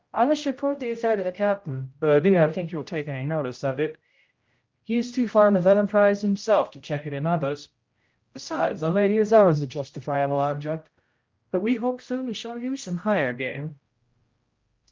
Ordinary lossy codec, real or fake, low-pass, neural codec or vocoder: Opus, 32 kbps; fake; 7.2 kHz; codec, 16 kHz, 0.5 kbps, X-Codec, HuBERT features, trained on general audio